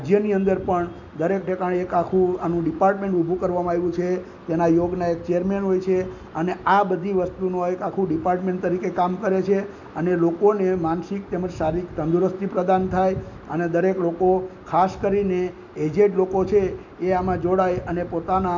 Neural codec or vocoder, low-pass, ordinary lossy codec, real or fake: none; 7.2 kHz; none; real